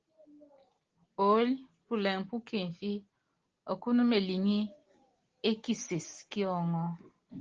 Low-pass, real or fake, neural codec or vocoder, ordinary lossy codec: 7.2 kHz; real; none; Opus, 16 kbps